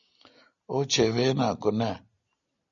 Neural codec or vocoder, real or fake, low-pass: none; real; 7.2 kHz